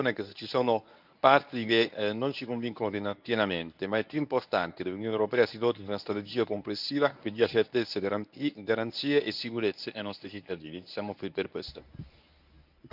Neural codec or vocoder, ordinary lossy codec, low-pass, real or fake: codec, 24 kHz, 0.9 kbps, WavTokenizer, medium speech release version 1; none; 5.4 kHz; fake